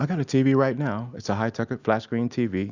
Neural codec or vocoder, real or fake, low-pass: none; real; 7.2 kHz